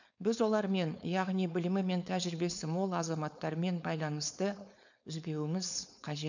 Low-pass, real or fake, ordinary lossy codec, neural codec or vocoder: 7.2 kHz; fake; none; codec, 16 kHz, 4.8 kbps, FACodec